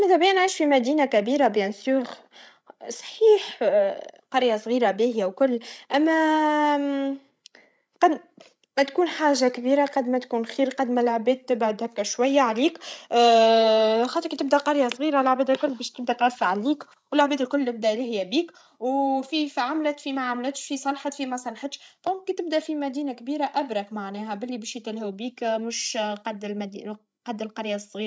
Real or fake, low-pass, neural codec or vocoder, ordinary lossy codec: fake; none; codec, 16 kHz, 16 kbps, FreqCodec, larger model; none